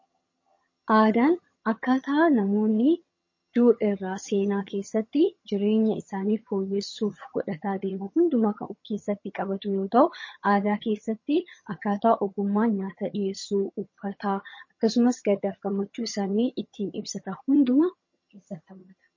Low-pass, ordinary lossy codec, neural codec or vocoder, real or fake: 7.2 kHz; MP3, 32 kbps; vocoder, 22.05 kHz, 80 mel bands, HiFi-GAN; fake